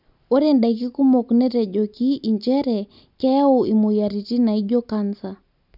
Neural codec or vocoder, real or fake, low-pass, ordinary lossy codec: none; real; 5.4 kHz; none